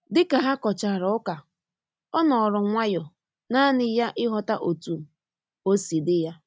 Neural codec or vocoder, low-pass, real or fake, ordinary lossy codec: none; none; real; none